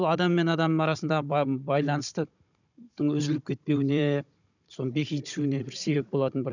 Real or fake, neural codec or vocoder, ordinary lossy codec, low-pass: fake; codec, 16 kHz, 16 kbps, FunCodec, trained on Chinese and English, 50 frames a second; none; 7.2 kHz